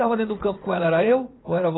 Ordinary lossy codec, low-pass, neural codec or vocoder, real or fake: AAC, 16 kbps; 7.2 kHz; vocoder, 44.1 kHz, 80 mel bands, Vocos; fake